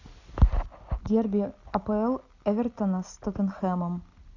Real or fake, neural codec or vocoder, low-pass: real; none; 7.2 kHz